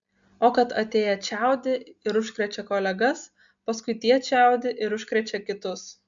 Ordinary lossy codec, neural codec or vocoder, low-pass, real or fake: MP3, 64 kbps; none; 7.2 kHz; real